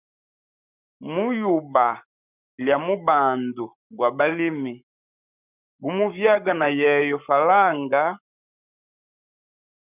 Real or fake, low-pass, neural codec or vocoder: fake; 3.6 kHz; codec, 44.1 kHz, 7.8 kbps, Pupu-Codec